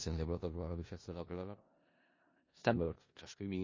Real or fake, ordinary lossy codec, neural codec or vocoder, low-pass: fake; MP3, 32 kbps; codec, 16 kHz in and 24 kHz out, 0.4 kbps, LongCat-Audio-Codec, four codebook decoder; 7.2 kHz